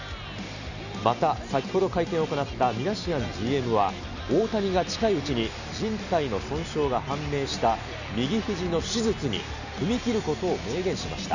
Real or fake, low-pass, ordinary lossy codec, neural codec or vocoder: real; 7.2 kHz; none; none